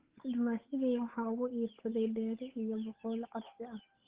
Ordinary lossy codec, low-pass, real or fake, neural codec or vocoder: Opus, 16 kbps; 3.6 kHz; fake; codec, 16 kHz, 8 kbps, FunCodec, trained on Chinese and English, 25 frames a second